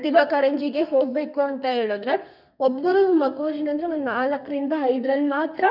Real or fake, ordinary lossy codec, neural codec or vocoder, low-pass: fake; none; codec, 32 kHz, 1.9 kbps, SNAC; 5.4 kHz